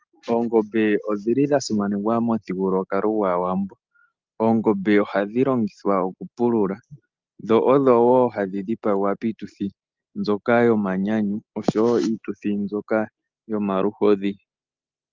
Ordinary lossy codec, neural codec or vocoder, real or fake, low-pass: Opus, 32 kbps; none; real; 7.2 kHz